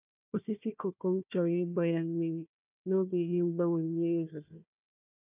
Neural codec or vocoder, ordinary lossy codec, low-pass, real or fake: codec, 16 kHz, 1 kbps, FunCodec, trained on Chinese and English, 50 frames a second; none; 3.6 kHz; fake